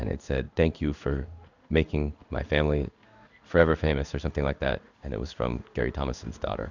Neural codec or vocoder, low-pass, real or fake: codec, 16 kHz in and 24 kHz out, 1 kbps, XY-Tokenizer; 7.2 kHz; fake